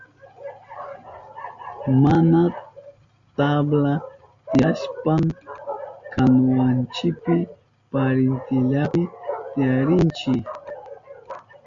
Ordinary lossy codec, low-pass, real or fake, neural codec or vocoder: Opus, 64 kbps; 7.2 kHz; real; none